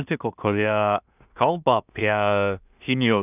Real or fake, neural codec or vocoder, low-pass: fake; codec, 16 kHz in and 24 kHz out, 0.4 kbps, LongCat-Audio-Codec, two codebook decoder; 3.6 kHz